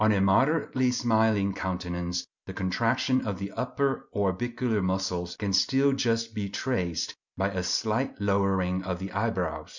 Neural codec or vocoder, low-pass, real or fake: none; 7.2 kHz; real